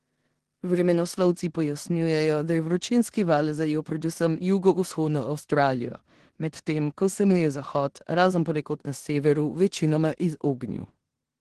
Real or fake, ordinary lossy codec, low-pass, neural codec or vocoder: fake; Opus, 16 kbps; 10.8 kHz; codec, 16 kHz in and 24 kHz out, 0.9 kbps, LongCat-Audio-Codec, four codebook decoder